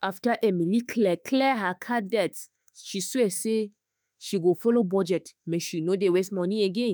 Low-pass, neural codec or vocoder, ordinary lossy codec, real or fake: none; autoencoder, 48 kHz, 32 numbers a frame, DAC-VAE, trained on Japanese speech; none; fake